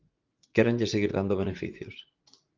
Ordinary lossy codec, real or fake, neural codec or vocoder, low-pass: Opus, 32 kbps; fake; vocoder, 44.1 kHz, 80 mel bands, Vocos; 7.2 kHz